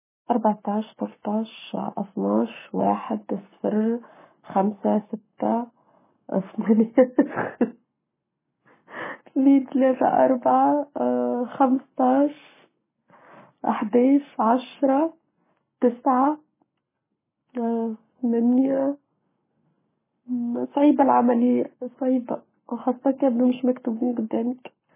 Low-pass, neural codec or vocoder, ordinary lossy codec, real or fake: 3.6 kHz; codec, 44.1 kHz, 7.8 kbps, Pupu-Codec; MP3, 16 kbps; fake